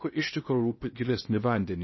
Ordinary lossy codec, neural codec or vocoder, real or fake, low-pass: MP3, 24 kbps; codec, 16 kHz in and 24 kHz out, 0.6 kbps, FocalCodec, streaming, 2048 codes; fake; 7.2 kHz